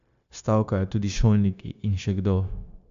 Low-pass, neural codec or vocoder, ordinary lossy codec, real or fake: 7.2 kHz; codec, 16 kHz, 0.9 kbps, LongCat-Audio-Codec; AAC, 64 kbps; fake